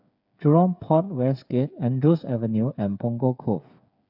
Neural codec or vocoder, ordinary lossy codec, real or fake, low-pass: codec, 16 kHz, 8 kbps, FreqCodec, smaller model; none; fake; 5.4 kHz